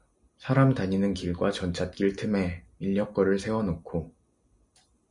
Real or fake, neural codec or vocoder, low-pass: real; none; 10.8 kHz